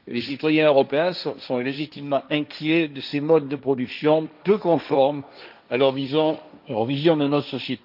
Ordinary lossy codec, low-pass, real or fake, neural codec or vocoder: none; 5.4 kHz; fake; codec, 16 kHz, 1.1 kbps, Voila-Tokenizer